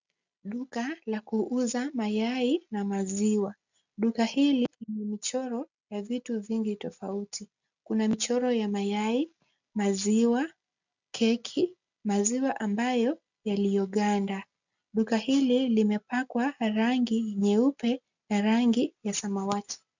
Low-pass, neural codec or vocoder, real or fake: 7.2 kHz; none; real